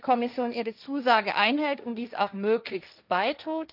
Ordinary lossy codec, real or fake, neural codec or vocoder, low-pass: none; fake; codec, 16 kHz, 1.1 kbps, Voila-Tokenizer; 5.4 kHz